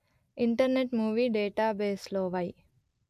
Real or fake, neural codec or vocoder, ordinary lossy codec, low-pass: real; none; AAC, 96 kbps; 14.4 kHz